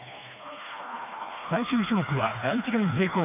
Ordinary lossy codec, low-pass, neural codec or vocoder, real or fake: none; 3.6 kHz; codec, 16 kHz, 2 kbps, FreqCodec, smaller model; fake